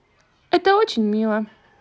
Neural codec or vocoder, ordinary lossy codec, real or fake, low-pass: none; none; real; none